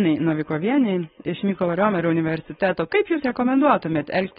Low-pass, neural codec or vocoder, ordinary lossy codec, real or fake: 7.2 kHz; codec, 16 kHz, 4.8 kbps, FACodec; AAC, 16 kbps; fake